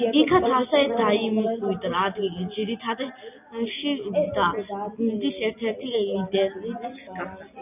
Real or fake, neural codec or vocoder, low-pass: real; none; 3.6 kHz